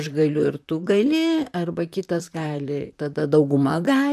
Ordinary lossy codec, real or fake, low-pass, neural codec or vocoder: AAC, 96 kbps; fake; 14.4 kHz; vocoder, 44.1 kHz, 128 mel bands, Pupu-Vocoder